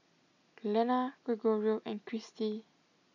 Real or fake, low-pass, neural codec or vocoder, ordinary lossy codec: real; 7.2 kHz; none; none